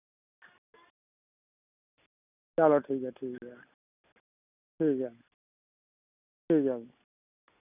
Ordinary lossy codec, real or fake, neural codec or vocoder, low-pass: none; real; none; 3.6 kHz